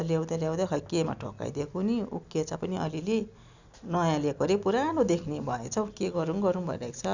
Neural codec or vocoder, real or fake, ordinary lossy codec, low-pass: none; real; none; 7.2 kHz